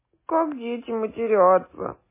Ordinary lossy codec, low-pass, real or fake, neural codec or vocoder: MP3, 16 kbps; 3.6 kHz; real; none